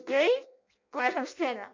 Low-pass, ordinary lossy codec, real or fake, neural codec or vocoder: 7.2 kHz; MP3, 64 kbps; fake; codec, 16 kHz in and 24 kHz out, 0.6 kbps, FireRedTTS-2 codec